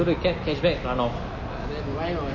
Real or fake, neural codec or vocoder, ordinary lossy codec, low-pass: real; none; MP3, 32 kbps; 7.2 kHz